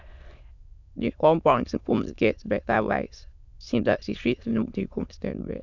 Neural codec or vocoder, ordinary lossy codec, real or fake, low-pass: autoencoder, 22.05 kHz, a latent of 192 numbers a frame, VITS, trained on many speakers; none; fake; 7.2 kHz